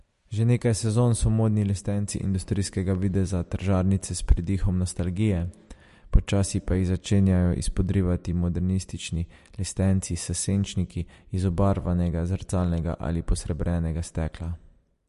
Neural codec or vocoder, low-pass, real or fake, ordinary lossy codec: none; 14.4 kHz; real; MP3, 48 kbps